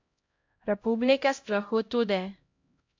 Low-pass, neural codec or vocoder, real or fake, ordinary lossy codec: 7.2 kHz; codec, 16 kHz, 0.5 kbps, X-Codec, HuBERT features, trained on LibriSpeech; fake; MP3, 48 kbps